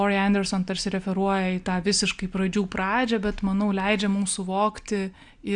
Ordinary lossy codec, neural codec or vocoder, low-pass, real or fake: Opus, 64 kbps; none; 9.9 kHz; real